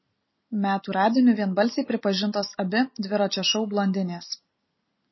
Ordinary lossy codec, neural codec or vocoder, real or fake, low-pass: MP3, 24 kbps; none; real; 7.2 kHz